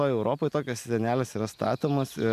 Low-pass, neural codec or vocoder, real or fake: 14.4 kHz; none; real